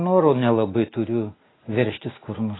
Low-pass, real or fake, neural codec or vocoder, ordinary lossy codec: 7.2 kHz; real; none; AAC, 16 kbps